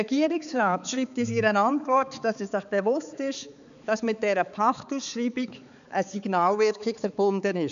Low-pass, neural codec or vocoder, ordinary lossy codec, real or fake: 7.2 kHz; codec, 16 kHz, 4 kbps, X-Codec, HuBERT features, trained on balanced general audio; none; fake